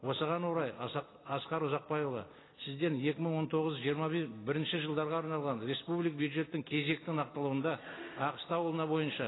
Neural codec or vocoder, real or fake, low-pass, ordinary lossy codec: none; real; 7.2 kHz; AAC, 16 kbps